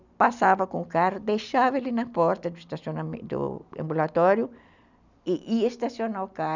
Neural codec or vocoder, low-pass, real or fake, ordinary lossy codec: none; 7.2 kHz; real; none